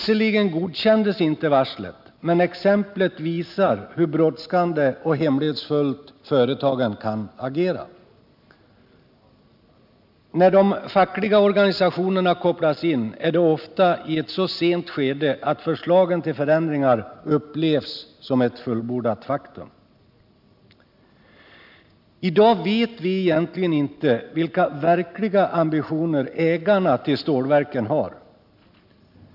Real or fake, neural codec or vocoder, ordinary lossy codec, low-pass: real; none; none; 5.4 kHz